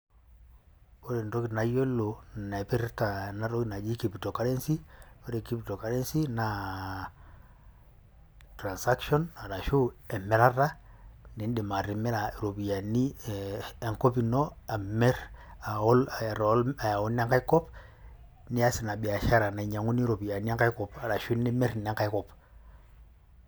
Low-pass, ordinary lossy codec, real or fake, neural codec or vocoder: none; none; real; none